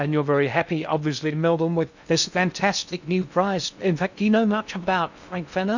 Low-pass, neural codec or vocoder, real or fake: 7.2 kHz; codec, 16 kHz in and 24 kHz out, 0.6 kbps, FocalCodec, streaming, 2048 codes; fake